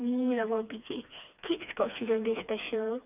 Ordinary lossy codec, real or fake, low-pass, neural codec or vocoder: none; fake; 3.6 kHz; codec, 16 kHz, 2 kbps, FreqCodec, smaller model